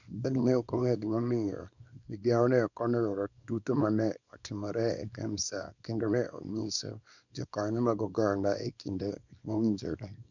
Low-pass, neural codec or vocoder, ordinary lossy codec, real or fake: 7.2 kHz; codec, 24 kHz, 0.9 kbps, WavTokenizer, small release; none; fake